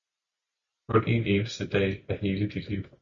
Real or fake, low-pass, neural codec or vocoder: real; 7.2 kHz; none